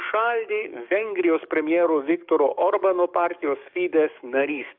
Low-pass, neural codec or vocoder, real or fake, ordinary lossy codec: 5.4 kHz; codec, 44.1 kHz, 7.8 kbps, DAC; fake; AAC, 48 kbps